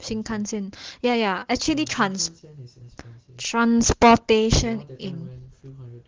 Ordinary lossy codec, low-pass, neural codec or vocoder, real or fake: Opus, 16 kbps; 7.2 kHz; none; real